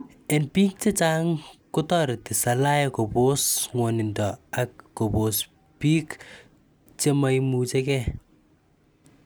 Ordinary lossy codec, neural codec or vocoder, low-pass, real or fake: none; none; none; real